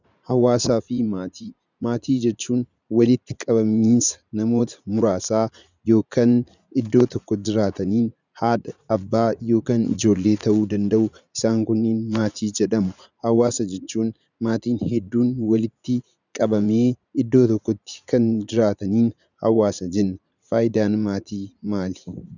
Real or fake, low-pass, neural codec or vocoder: fake; 7.2 kHz; vocoder, 44.1 kHz, 80 mel bands, Vocos